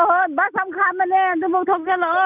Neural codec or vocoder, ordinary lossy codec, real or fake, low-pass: none; none; real; 3.6 kHz